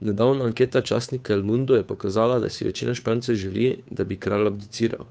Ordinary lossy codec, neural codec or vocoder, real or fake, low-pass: none; codec, 16 kHz, 2 kbps, FunCodec, trained on Chinese and English, 25 frames a second; fake; none